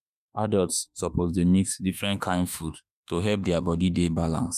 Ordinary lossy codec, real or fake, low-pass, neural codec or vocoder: none; fake; 14.4 kHz; autoencoder, 48 kHz, 32 numbers a frame, DAC-VAE, trained on Japanese speech